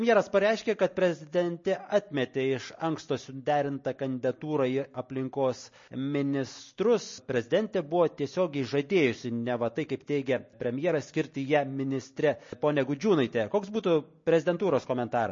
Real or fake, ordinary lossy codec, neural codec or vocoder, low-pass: real; MP3, 32 kbps; none; 7.2 kHz